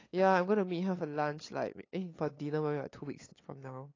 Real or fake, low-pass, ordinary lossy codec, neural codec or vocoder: fake; 7.2 kHz; AAC, 32 kbps; codec, 16 kHz, 8 kbps, FunCodec, trained on LibriTTS, 25 frames a second